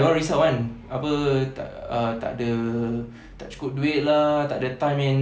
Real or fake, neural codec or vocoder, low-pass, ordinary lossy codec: real; none; none; none